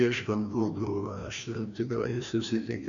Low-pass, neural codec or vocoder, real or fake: 7.2 kHz; codec, 16 kHz, 1 kbps, FreqCodec, larger model; fake